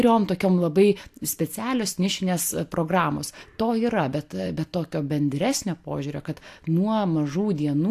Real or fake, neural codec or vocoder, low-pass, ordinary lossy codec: real; none; 14.4 kHz; AAC, 64 kbps